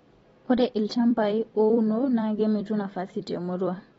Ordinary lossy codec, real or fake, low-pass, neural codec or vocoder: AAC, 24 kbps; fake; 19.8 kHz; vocoder, 44.1 kHz, 128 mel bands every 256 samples, BigVGAN v2